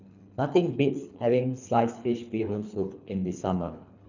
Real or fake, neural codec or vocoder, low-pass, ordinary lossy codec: fake; codec, 24 kHz, 3 kbps, HILCodec; 7.2 kHz; none